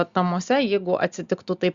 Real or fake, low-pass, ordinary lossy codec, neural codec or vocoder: real; 7.2 kHz; Opus, 64 kbps; none